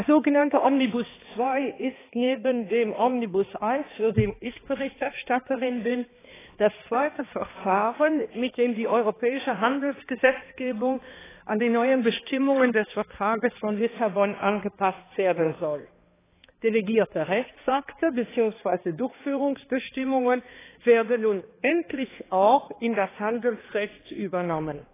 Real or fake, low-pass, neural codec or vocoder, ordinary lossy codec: fake; 3.6 kHz; codec, 16 kHz, 2 kbps, X-Codec, HuBERT features, trained on balanced general audio; AAC, 16 kbps